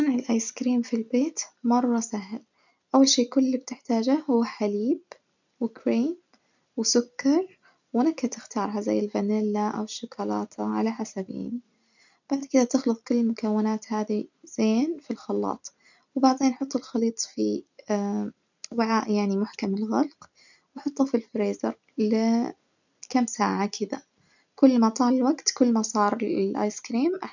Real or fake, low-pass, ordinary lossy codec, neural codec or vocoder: real; 7.2 kHz; none; none